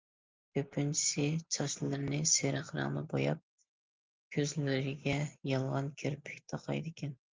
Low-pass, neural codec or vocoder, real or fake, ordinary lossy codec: 7.2 kHz; none; real; Opus, 24 kbps